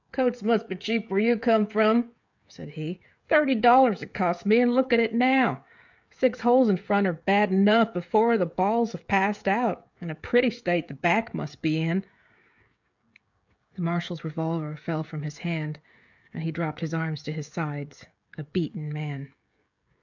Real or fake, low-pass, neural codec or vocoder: fake; 7.2 kHz; codec, 16 kHz, 16 kbps, FreqCodec, smaller model